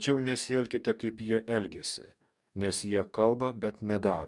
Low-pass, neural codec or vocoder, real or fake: 10.8 kHz; codec, 44.1 kHz, 2.6 kbps, DAC; fake